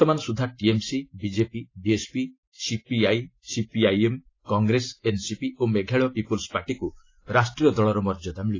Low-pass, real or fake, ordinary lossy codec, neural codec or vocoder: 7.2 kHz; real; AAC, 32 kbps; none